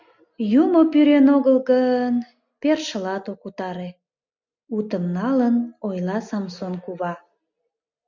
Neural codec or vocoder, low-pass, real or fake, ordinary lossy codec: none; 7.2 kHz; real; MP3, 64 kbps